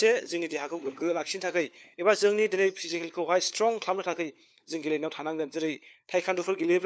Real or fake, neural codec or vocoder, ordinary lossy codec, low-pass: fake; codec, 16 kHz, 4 kbps, FunCodec, trained on LibriTTS, 50 frames a second; none; none